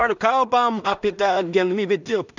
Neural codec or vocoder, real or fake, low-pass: codec, 16 kHz in and 24 kHz out, 0.4 kbps, LongCat-Audio-Codec, two codebook decoder; fake; 7.2 kHz